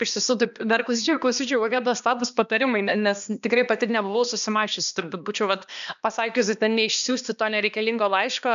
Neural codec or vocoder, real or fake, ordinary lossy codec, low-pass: codec, 16 kHz, 2 kbps, X-Codec, HuBERT features, trained on balanced general audio; fake; AAC, 96 kbps; 7.2 kHz